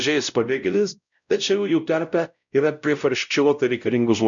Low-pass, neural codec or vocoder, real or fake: 7.2 kHz; codec, 16 kHz, 0.5 kbps, X-Codec, WavLM features, trained on Multilingual LibriSpeech; fake